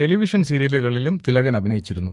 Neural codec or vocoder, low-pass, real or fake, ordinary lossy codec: codec, 44.1 kHz, 2.6 kbps, SNAC; 10.8 kHz; fake; MP3, 64 kbps